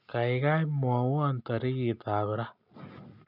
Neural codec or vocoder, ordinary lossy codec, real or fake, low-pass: none; none; real; 5.4 kHz